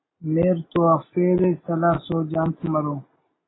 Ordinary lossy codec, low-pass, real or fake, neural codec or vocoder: AAC, 16 kbps; 7.2 kHz; real; none